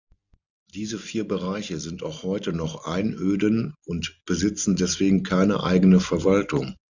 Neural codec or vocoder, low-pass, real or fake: none; 7.2 kHz; real